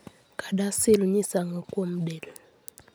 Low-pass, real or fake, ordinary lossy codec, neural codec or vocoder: none; real; none; none